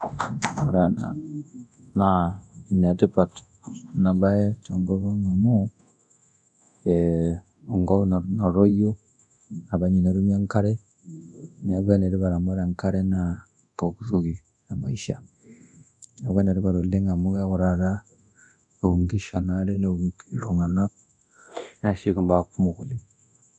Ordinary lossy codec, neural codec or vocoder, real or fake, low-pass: none; codec, 24 kHz, 0.9 kbps, DualCodec; fake; 10.8 kHz